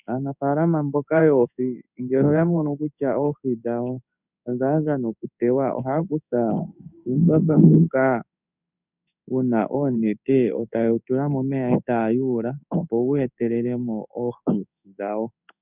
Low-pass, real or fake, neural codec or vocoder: 3.6 kHz; fake; codec, 16 kHz in and 24 kHz out, 1 kbps, XY-Tokenizer